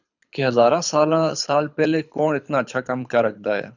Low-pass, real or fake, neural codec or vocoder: 7.2 kHz; fake; codec, 24 kHz, 6 kbps, HILCodec